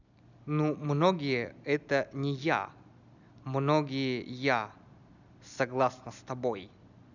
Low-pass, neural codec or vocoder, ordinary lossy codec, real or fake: 7.2 kHz; none; none; real